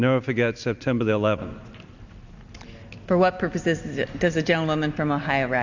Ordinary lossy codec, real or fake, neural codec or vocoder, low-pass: Opus, 64 kbps; real; none; 7.2 kHz